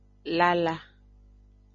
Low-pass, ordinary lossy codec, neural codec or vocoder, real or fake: 7.2 kHz; MP3, 32 kbps; none; real